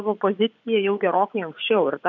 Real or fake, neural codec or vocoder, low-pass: fake; vocoder, 24 kHz, 100 mel bands, Vocos; 7.2 kHz